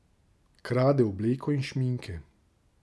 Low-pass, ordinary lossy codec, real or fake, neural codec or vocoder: none; none; real; none